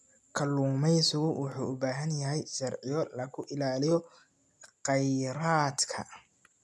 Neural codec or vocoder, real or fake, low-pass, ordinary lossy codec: none; real; none; none